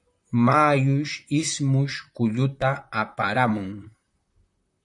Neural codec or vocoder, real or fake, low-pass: vocoder, 44.1 kHz, 128 mel bands, Pupu-Vocoder; fake; 10.8 kHz